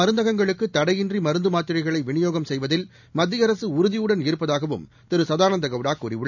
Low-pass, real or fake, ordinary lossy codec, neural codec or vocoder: 7.2 kHz; real; none; none